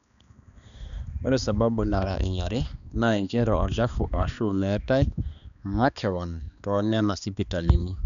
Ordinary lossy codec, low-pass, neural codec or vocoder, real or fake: MP3, 96 kbps; 7.2 kHz; codec, 16 kHz, 2 kbps, X-Codec, HuBERT features, trained on balanced general audio; fake